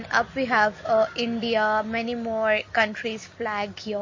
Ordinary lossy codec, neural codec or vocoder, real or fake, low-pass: MP3, 32 kbps; none; real; 7.2 kHz